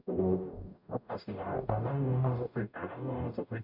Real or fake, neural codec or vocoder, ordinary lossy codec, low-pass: fake; codec, 44.1 kHz, 0.9 kbps, DAC; none; 5.4 kHz